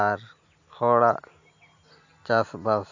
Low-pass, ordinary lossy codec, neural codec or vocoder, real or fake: 7.2 kHz; AAC, 48 kbps; none; real